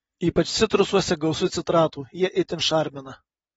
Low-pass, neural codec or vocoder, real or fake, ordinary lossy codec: 9.9 kHz; none; real; AAC, 24 kbps